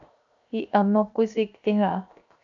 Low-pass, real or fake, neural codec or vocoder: 7.2 kHz; fake; codec, 16 kHz, 0.7 kbps, FocalCodec